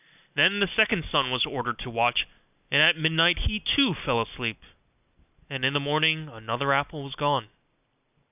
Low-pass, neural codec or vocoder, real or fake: 3.6 kHz; none; real